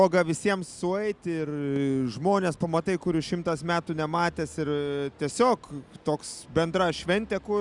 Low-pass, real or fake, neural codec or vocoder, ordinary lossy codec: 10.8 kHz; real; none; Opus, 64 kbps